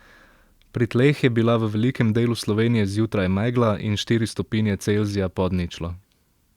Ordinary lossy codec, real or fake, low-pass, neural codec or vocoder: Opus, 64 kbps; real; 19.8 kHz; none